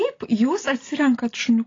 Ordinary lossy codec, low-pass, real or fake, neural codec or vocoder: AAC, 32 kbps; 7.2 kHz; real; none